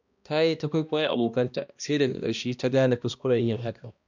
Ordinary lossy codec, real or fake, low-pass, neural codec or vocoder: none; fake; 7.2 kHz; codec, 16 kHz, 1 kbps, X-Codec, HuBERT features, trained on balanced general audio